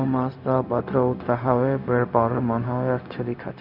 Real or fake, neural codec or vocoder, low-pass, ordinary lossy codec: fake; codec, 16 kHz, 0.4 kbps, LongCat-Audio-Codec; 5.4 kHz; none